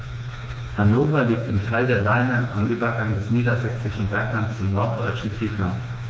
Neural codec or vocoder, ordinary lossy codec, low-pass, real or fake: codec, 16 kHz, 2 kbps, FreqCodec, smaller model; none; none; fake